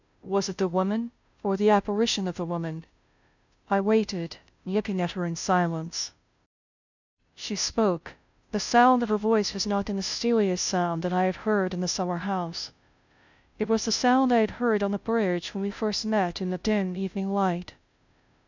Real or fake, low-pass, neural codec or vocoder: fake; 7.2 kHz; codec, 16 kHz, 0.5 kbps, FunCodec, trained on Chinese and English, 25 frames a second